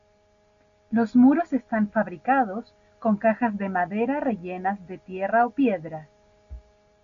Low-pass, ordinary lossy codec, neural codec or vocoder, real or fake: 7.2 kHz; MP3, 64 kbps; none; real